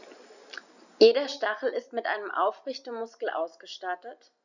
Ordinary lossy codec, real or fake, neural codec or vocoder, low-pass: none; real; none; 7.2 kHz